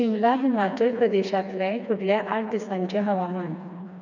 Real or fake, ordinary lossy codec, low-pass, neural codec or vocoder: fake; none; 7.2 kHz; codec, 16 kHz, 2 kbps, FreqCodec, smaller model